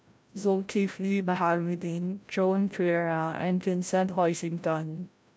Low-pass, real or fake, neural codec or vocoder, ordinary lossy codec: none; fake; codec, 16 kHz, 0.5 kbps, FreqCodec, larger model; none